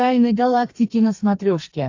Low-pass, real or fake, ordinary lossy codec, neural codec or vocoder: 7.2 kHz; fake; AAC, 48 kbps; codec, 32 kHz, 1.9 kbps, SNAC